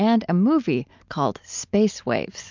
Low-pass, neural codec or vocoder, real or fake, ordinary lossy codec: 7.2 kHz; none; real; MP3, 64 kbps